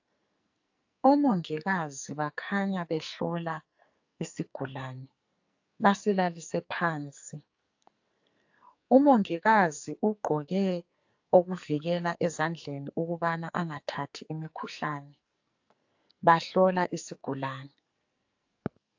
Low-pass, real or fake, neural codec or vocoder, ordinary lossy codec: 7.2 kHz; fake; codec, 44.1 kHz, 2.6 kbps, SNAC; AAC, 48 kbps